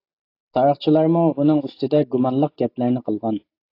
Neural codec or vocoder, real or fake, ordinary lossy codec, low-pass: none; real; AAC, 32 kbps; 5.4 kHz